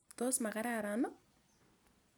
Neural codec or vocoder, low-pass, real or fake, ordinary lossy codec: vocoder, 44.1 kHz, 128 mel bands every 256 samples, BigVGAN v2; none; fake; none